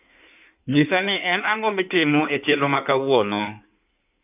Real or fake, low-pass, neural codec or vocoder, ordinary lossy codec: fake; 3.6 kHz; codec, 16 kHz in and 24 kHz out, 1.1 kbps, FireRedTTS-2 codec; none